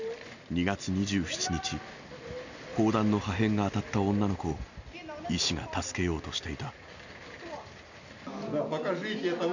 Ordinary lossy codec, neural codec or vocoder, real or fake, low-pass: none; none; real; 7.2 kHz